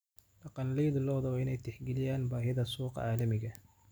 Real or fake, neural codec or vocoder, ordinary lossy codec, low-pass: real; none; none; none